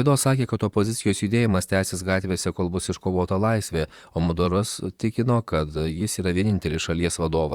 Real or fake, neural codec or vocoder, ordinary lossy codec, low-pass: fake; vocoder, 44.1 kHz, 128 mel bands, Pupu-Vocoder; Opus, 64 kbps; 19.8 kHz